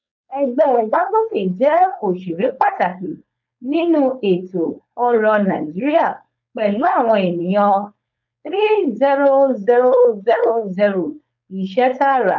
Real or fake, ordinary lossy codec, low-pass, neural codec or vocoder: fake; none; 7.2 kHz; codec, 16 kHz, 4.8 kbps, FACodec